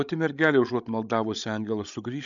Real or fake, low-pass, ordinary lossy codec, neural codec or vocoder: fake; 7.2 kHz; MP3, 96 kbps; codec, 16 kHz, 16 kbps, FreqCodec, larger model